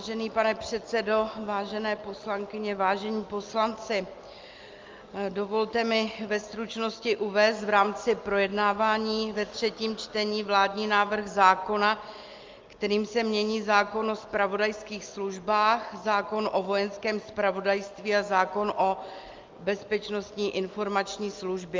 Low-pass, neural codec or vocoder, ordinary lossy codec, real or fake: 7.2 kHz; none; Opus, 24 kbps; real